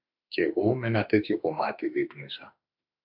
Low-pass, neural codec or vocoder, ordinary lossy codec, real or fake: 5.4 kHz; autoencoder, 48 kHz, 32 numbers a frame, DAC-VAE, trained on Japanese speech; MP3, 48 kbps; fake